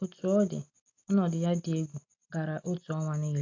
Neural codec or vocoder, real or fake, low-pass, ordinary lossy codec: none; real; 7.2 kHz; AAC, 48 kbps